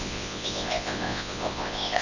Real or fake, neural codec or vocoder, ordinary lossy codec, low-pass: fake; codec, 24 kHz, 0.9 kbps, WavTokenizer, large speech release; none; 7.2 kHz